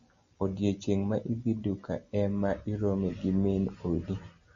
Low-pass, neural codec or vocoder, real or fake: 7.2 kHz; none; real